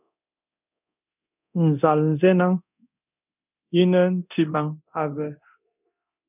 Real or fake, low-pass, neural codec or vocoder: fake; 3.6 kHz; codec, 24 kHz, 0.9 kbps, DualCodec